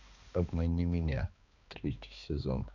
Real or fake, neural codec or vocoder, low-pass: fake; codec, 16 kHz, 2 kbps, X-Codec, HuBERT features, trained on balanced general audio; 7.2 kHz